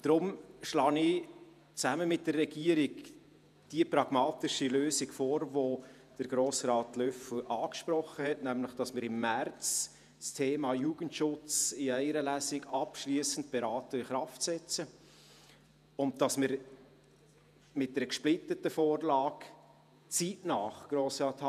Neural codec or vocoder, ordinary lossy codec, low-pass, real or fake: vocoder, 48 kHz, 128 mel bands, Vocos; none; 14.4 kHz; fake